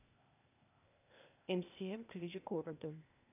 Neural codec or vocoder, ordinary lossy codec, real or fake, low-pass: codec, 16 kHz, 0.8 kbps, ZipCodec; none; fake; 3.6 kHz